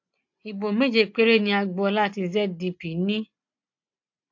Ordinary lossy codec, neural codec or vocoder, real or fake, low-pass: AAC, 48 kbps; none; real; 7.2 kHz